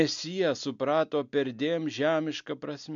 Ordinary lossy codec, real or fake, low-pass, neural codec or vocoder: MP3, 64 kbps; real; 7.2 kHz; none